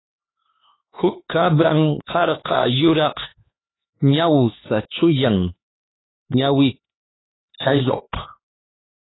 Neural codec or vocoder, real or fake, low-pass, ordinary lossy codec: codec, 16 kHz, 4 kbps, X-Codec, HuBERT features, trained on LibriSpeech; fake; 7.2 kHz; AAC, 16 kbps